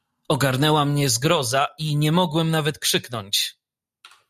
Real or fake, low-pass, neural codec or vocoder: real; 14.4 kHz; none